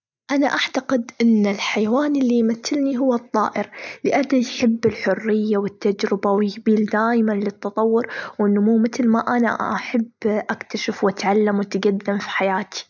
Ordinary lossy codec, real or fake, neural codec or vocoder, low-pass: none; real; none; 7.2 kHz